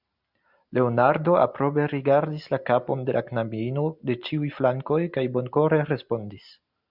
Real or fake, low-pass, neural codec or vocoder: real; 5.4 kHz; none